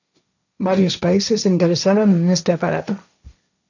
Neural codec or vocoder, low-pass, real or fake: codec, 16 kHz, 1.1 kbps, Voila-Tokenizer; 7.2 kHz; fake